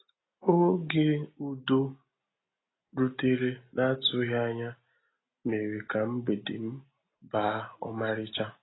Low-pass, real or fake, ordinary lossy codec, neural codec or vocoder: 7.2 kHz; real; AAC, 16 kbps; none